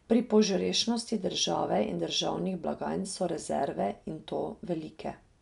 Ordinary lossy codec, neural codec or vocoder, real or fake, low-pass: none; none; real; 10.8 kHz